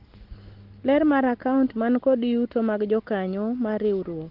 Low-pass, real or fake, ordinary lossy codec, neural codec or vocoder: 5.4 kHz; real; Opus, 16 kbps; none